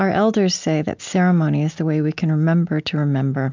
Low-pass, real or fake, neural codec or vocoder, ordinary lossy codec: 7.2 kHz; real; none; MP3, 64 kbps